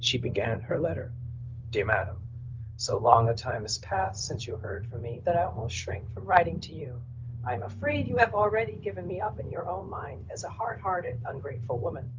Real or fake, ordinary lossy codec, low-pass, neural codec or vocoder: fake; Opus, 32 kbps; 7.2 kHz; codec, 16 kHz, 0.4 kbps, LongCat-Audio-Codec